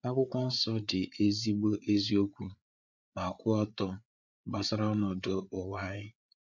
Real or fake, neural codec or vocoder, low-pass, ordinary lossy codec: fake; codec, 16 kHz, 8 kbps, FreqCodec, larger model; 7.2 kHz; none